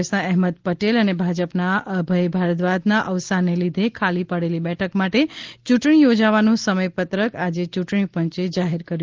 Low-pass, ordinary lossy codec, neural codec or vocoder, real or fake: 7.2 kHz; Opus, 16 kbps; none; real